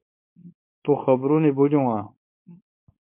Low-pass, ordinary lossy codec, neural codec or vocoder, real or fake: 3.6 kHz; MP3, 32 kbps; codec, 16 kHz, 4.8 kbps, FACodec; fake